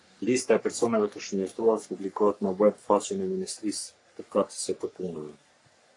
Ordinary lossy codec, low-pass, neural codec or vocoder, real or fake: AAC, 48 kbps; 10.8 kHz; codec, 44.1 kHz, 3.4 kbps, Pupu-Codec; fake